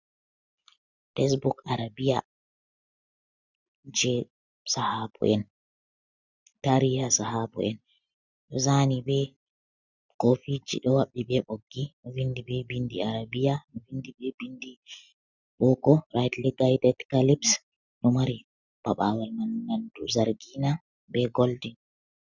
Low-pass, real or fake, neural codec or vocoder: 7.2 kHz; real; none